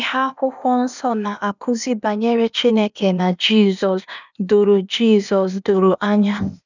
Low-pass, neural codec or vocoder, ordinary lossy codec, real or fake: 7.2 kHz; codec, 16 kHz, 0.8 kbps, ZipCodec; none; fake